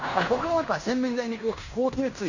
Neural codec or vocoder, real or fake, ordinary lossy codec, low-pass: codec, 16 kHz in and 24 kHz out, 0.9 kbps, LongCat-Audio-Codec, fine tuned four codebook decoder; fake; none; 7.2 kHz